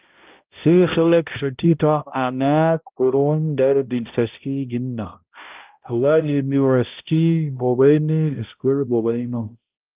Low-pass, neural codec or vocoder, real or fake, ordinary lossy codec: 3.6 kHz; codec, 16 kHz, 0.5 kbps, X-Codec, HuBERT features, trained on balanced general audio; fake; Opus, 24 kbps